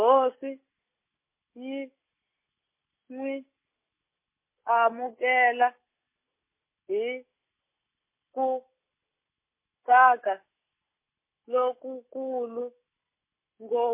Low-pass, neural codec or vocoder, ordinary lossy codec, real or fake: 3.6 kHz; none; MP3, 24 kbps; real